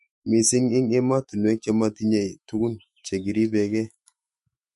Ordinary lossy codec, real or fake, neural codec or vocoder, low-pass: MP3, 48 kbps; fake; autoencoder, 48 kHz, 128 numbers a frame, DAC-VAE, trained on Japanese speech; 14.4 kHz